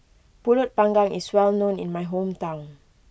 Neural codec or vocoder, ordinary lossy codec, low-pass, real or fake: none; none; none; real